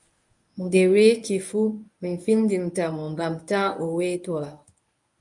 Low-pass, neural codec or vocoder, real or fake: 10.8 kHz; codec, 24 kHz, 0.9 kbps, WavTokenizer, medium speech release version 1; fake